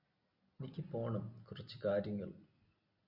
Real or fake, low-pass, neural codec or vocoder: real; 5.4 kHz; none